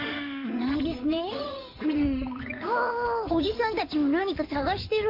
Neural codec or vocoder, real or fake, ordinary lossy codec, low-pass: codec, 16 kHz in and 24 kHz out, 2.2 kbps, FireRedTTS-2 codec; fake; AAC, 32 kbps; 5.4 kHz